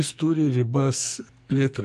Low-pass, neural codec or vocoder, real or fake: 14.4 kHz; codec, 44.1 kHz, 2.6 kbps, SNAC; fake